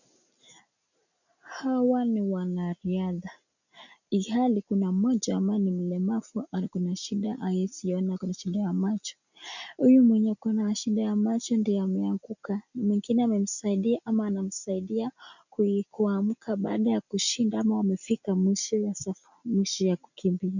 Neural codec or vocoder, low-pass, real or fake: none; 7.2 kHz; real